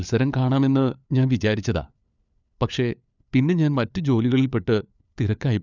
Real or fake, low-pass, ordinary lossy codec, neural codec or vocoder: fake; 7.2 kHz; none; codec, 16 kHz, 4 kbps, FunCodec, trained on LibriTTS, 50 frames a second